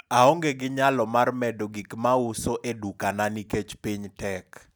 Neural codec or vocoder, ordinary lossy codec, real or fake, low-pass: none; none; real; none